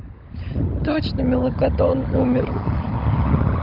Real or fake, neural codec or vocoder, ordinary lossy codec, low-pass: fake; codec, 16 kHz, 16 kbps, FunCodec, trained on LibriTTS, 50 frames a second; Opus, 24 kbps; 5.4 kHz